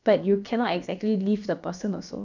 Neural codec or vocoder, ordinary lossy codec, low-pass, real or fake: codec, 16 kHz, about 1 kbps, DyCAST, with the encoder's durations; none; 7.2 kHz; fake